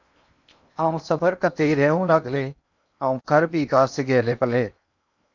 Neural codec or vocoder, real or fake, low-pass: codec, 16 kHz in and 24 kHz out, 0.8 kbps, FocalCodec, streaming, 65536 codes; fake; 7.2 kHz